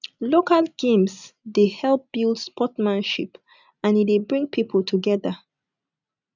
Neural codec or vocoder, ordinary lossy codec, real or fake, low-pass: none; none; real; 7.2 kHz